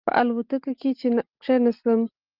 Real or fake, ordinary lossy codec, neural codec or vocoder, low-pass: real; Opus, 24 kbps; none; 5.4 kHz